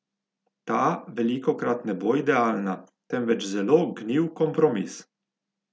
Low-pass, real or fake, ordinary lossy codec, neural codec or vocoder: none; real; none; none